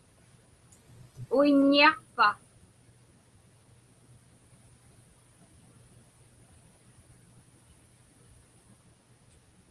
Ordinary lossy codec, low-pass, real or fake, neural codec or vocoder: Opus, 24 kbps; 10.8 kHz; real; none